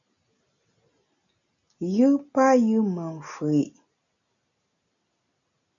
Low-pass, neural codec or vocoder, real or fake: 7.2 kHz; none; real